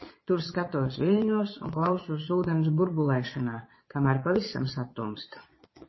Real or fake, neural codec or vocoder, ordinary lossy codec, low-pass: fake; vocoder, 22.05 kHz, 80 mel bands, Vocos; MP3, 24 kbps; 7.2 kHz